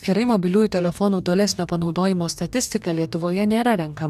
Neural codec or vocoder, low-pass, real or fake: codec, 44.1 kHz, 2.6 kbps, DAC; 14.4 kHz; fake